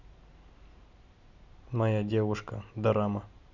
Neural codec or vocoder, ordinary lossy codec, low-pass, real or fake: none; none; 7.2 kHz; real